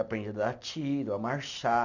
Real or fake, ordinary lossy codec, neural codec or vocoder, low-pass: real; none; none; 7.2 kHz